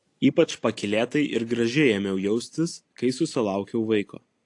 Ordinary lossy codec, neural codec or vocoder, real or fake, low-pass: AAC, 48 kbps; none; real; 10.8 kHz